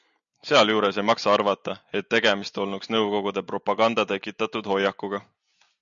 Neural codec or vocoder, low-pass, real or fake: none; 7.2 kHz; real